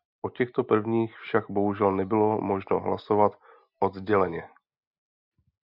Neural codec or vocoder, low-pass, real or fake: none; 5.4 kHz; real